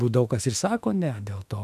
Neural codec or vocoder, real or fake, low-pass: autoencoder, 48 kHz, 32 numbers a frame, DAC-VAE, trained on Japanese speech; fake; 14.4 kHz